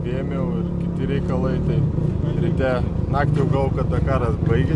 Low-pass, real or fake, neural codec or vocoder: 10.8 kHz; real; none